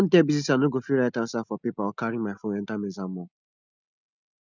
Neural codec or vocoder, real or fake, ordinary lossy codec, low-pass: none; real; none; 7.2 kHz